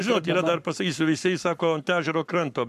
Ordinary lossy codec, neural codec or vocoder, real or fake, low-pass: MP3, 96 kbps; codec, 44.1 kHz, 7.8 kbps, Pupu-Codec; fake; 14.4 kHz